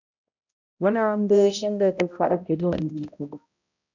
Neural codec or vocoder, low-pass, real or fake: codec, 16 kHz, 0.5 kbps, X-Codec, HuBERT features, trained on balanced general audio; 7.2 kHz; fake